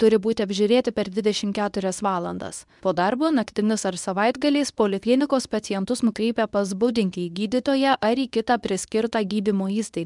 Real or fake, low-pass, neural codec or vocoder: fake; 10.8 kHz; codec, 24 kHz, 0.9 kbps, WavTokenizer, medium speech release version 2